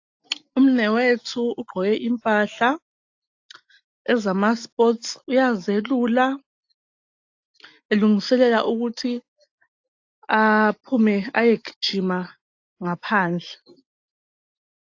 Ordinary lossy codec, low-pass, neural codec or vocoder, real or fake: AAC, 48 kbps; 7.2 kHz; none; real